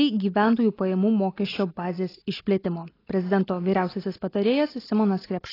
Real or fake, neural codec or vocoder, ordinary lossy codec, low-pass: real; none; AAC, 24 kbps; 5.4 kHz